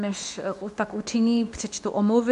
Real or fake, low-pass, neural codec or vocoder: fake; 10.8 kHz; codec, 24 kHz, 0.9 kbps, WavTokenizer, medium speech release version 2